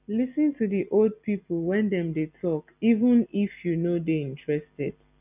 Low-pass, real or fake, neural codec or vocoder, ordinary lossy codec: 3.6 kHz; real; none; none